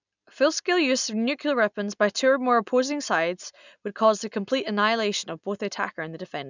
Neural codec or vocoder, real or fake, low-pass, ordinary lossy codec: none; real; 7.2 kHz; none